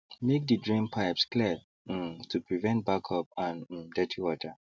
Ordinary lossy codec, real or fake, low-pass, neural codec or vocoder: none; real; none; none